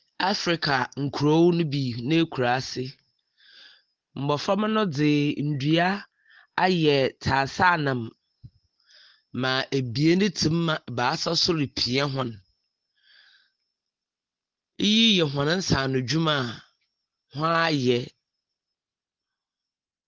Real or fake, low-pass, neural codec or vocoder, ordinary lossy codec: real; 7.2 kHz; none; Opus, 16 kbps